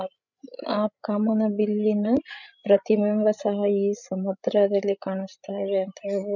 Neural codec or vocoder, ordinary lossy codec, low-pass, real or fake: codec, 16 kHz, 16 kbps, FreqCodec, larger model; none; 7.2 kHz; fake